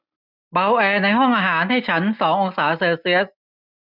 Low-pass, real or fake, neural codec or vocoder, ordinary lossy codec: 5.4 kHz; real; none; none